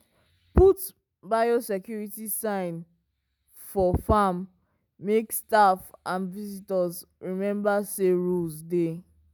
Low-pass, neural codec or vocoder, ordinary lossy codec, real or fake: none; none; none; real